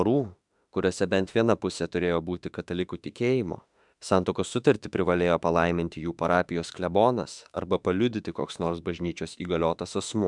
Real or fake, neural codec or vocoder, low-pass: fake; autoencoder, 48 kHz, 32 numbers a frame, DAC-VAE, trained on Japanese speech; 10.8 kHz